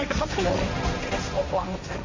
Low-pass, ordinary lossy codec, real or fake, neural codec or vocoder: 7.2 kHz; AAC, 48 kbps; fake; codec, 16 kHz, 1.1 kbps, Voila-Tokenizer